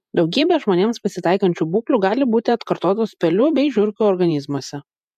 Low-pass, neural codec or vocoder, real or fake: 14.4 kHz; vocoder, 48 kHz, 128 mel bands, Vocos; fake